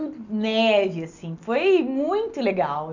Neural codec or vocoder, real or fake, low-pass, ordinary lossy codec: none; real; 7.2 kHz; none